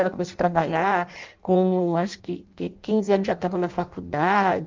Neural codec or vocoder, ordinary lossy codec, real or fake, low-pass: codec, 16 kHz in and 24 kHz out, 0.6 kbps, FireRedTTS-2 codec; Opus, 32 kbps; fake; 7.2 kHz